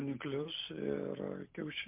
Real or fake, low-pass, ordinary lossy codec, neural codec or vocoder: real; 3.6 kHz; MP3, 32 kbps; none